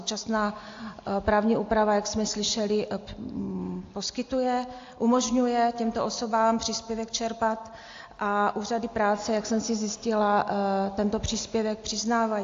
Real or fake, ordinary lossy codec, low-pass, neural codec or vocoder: real; AAC, 48 kbps; 7.2 kHz; none